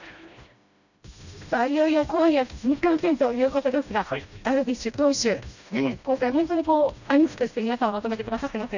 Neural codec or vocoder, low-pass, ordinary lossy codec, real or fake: codec, 16 kHz, 1 kbps, FreqCodec, smaller model; 7.2 kHz; none; fake